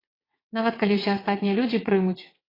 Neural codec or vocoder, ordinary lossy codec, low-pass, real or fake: vocoder, 22.05 kHz, 80 mel bands, WaveNeXt; AAC, 24 kbps; 5.4 kHz; fake